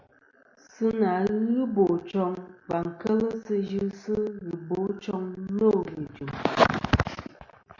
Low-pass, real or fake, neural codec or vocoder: 7.2 kHz; real; none